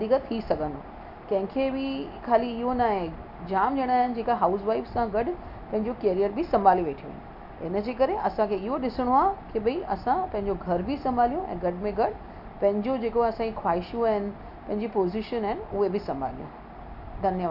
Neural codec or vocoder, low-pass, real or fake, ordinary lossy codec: none; 5.4 kHz; real; none